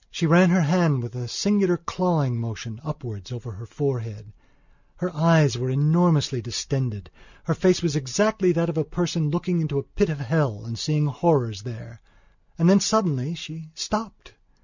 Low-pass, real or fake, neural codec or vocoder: 7.2 kHz; real; none